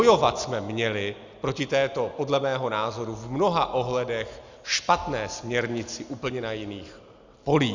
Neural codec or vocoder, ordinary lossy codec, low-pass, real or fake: none; Opus, 64 kbps; 7.2 kHz; real